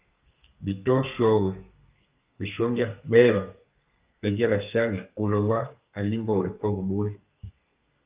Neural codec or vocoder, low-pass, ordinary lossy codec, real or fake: codec, 32 kHz, 1.9 kbps, SNAC; 3.6 kHz; Opus, 24 kbps; fake